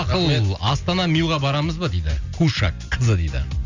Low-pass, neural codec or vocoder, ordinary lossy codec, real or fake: 7.2 kHz; none; Opus, 64 kbps; real